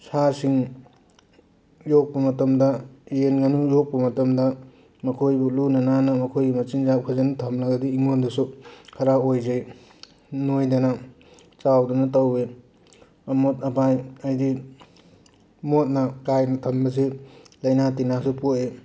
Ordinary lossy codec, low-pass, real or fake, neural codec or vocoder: none; none; real; none